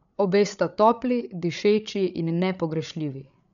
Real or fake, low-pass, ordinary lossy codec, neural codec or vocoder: fake; 7.2 kHz; none; codec, 16 kHz, 8 kbps, FreqCodec, larger model